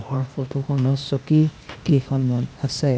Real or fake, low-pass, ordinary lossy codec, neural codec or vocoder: fake; none; none; codec, 16 kHz, 0.8 kbps, ZipCodec